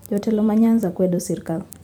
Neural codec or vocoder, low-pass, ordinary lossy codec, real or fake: vocoder, 44.1 kHz, 128 mel bands every 256 samples, BigVGAN v2; 19.8 kHz; none; fake